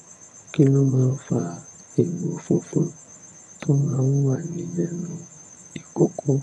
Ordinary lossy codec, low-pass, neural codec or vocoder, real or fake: none; none; vocoder, 22.05 kHz, 80 mel bands, HiFi-GAN; fake